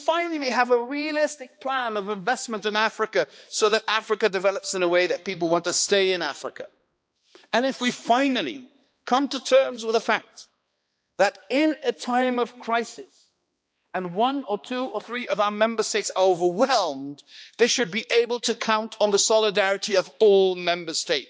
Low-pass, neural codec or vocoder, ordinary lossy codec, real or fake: none; codec, 16 kHz, 2 kbps, X-Codec, HuBERT features, trained on balanced general audio; none; fake